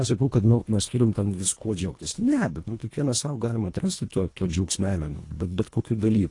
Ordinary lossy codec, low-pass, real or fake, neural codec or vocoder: AAC, 48 kbps; 10.8 kHz; fake; codec, 24 kHz, 1.5 kbps, HILCodec